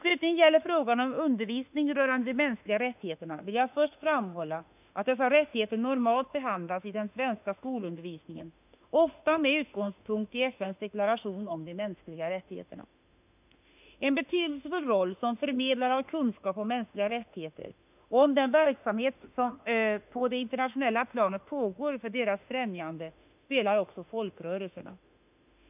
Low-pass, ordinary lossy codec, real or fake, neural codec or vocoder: 3.6 kHz; none; fake; autoencoder, 48 kHz, 32 numbers a frame, DAC-VAE, trained on Japanese speech